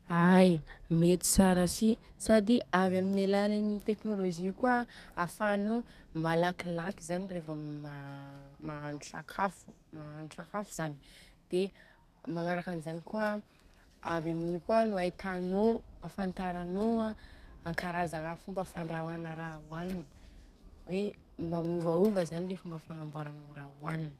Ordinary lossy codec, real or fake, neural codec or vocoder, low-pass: none; fake; codec, 32 kHz, 1.9 kbps, SNAC; 14.4 kHz